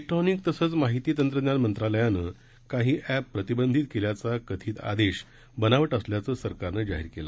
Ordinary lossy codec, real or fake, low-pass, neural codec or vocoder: none; real; none; none